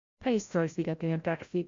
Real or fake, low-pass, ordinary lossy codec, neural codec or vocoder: fake; 7.2 kHz; MP3, 48 kbps; codec, 16 kHz, 0.5 kbps, FreqCodec, larger model